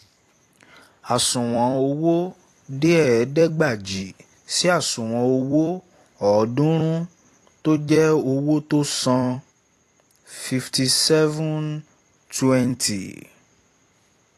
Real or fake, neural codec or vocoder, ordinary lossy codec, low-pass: fake; vocoder, 44.1 kHz, 128 mel bands every 256 samples, BigVGAN v2; AAC, 48 kbps; 14.4 kHz